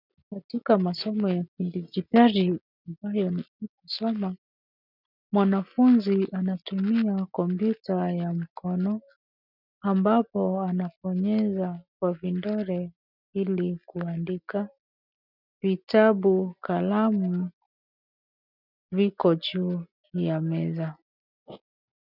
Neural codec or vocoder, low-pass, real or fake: none; 5.4 kHz; real